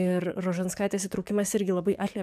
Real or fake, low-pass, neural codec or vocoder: fake; 14.4 kHz; codec, 44.1 kHz, 7.8 kbps, DAC